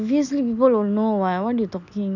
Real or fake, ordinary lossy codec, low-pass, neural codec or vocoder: real; none; 7.2 kHz; none